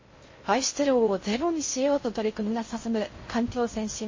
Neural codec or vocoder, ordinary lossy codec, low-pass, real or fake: codec, 16 kHz in and 24 kHz out, 0.6 kbps, FocalCodec, streaming, 2048 codes; MP3, 32 kbps; 7.2 kHz; fake